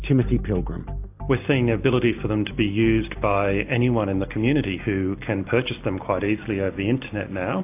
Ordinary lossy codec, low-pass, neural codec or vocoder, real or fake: AAC, 32 kbps; 3.6 kHz; none; real